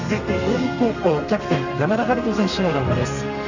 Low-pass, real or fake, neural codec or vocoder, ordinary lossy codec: 7.2 kHz; fake; codec, 32 kHz, 1.9 kbps, SNAC; Opus, 64 kbps